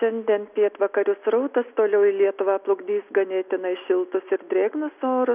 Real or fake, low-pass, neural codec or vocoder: real; 3.6 kHz; none